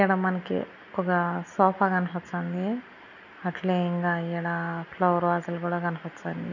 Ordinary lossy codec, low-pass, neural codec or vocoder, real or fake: none; 7.2 kHz; none; real